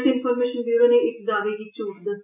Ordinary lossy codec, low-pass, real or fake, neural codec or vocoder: none; 3.6 kHz; real; none